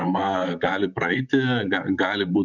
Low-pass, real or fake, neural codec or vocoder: 7.2 kHz; fake; vocoder, 44.1 kHz, 128 mel bands, Pupu-Vocoder